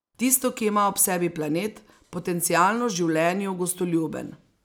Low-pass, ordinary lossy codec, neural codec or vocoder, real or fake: none; none; none; real